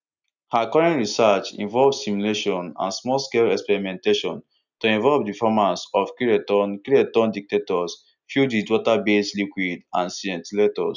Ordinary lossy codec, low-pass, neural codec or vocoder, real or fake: none; 7.2 kHz; none; real